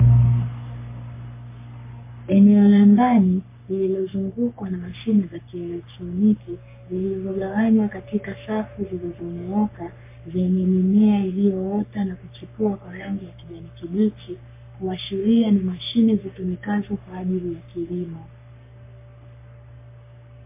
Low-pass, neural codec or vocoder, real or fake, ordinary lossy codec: 3.6 kHz; codec, 44.1 kHz, 3.4 kbps, Pupu-Codec; fake; MP3, 24 kbps